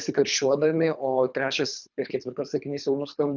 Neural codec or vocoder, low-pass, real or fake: codec, 24 kHz, 3 kbps, HILCodec; 7.2 kHz; fake